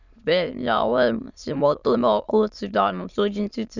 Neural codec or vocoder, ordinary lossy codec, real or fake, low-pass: autoencoder, 22.05 kHz, a latent of 192 numbers a frame, VITS, trained on many speakers; none; fake; 7.2 kHz